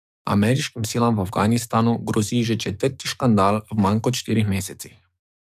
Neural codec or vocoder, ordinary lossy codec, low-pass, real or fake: codec, 44.1 kHz, 7.8 kbps, DAC; none; 14.4 kHz; fake